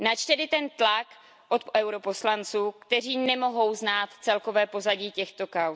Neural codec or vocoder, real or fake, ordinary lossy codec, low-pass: none; real; none; none